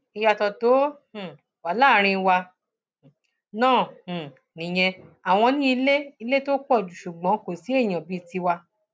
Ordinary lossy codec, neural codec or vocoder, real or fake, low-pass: none; none; real; none